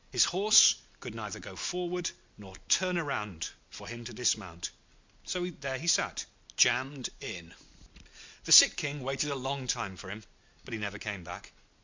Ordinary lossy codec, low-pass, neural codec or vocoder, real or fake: MP3, 64 kbps; 7.2 kHz; none; real